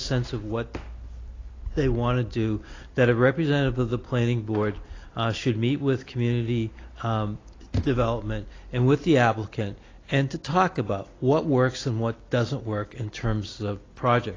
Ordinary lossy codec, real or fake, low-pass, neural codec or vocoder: AAC, 32 kbps; real; 7.2 kHz; none